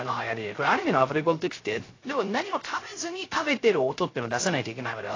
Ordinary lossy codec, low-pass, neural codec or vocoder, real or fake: AAC, 32 kbps; 7.2 kHz; codec, 16 kHz, 0.3 kbps, FocalCodec; fake